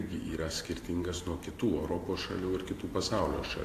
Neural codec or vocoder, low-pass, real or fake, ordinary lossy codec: vocoder, 44.1 kHz, 128 mel bands every 512 samples, BigVGAN v2; 14.4 kHz; fake; AAC, 64 kbps